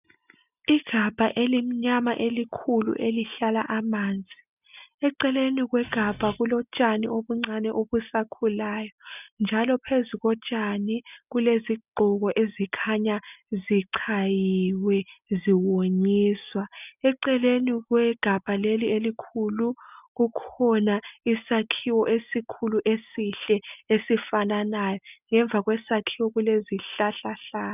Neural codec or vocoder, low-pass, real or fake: none; 3.6 kHz; real